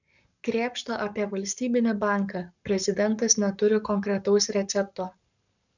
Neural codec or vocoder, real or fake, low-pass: codec, 44.1 kHz, 7.8 kbps, Pupu-Codec; fake; 7.2 kHz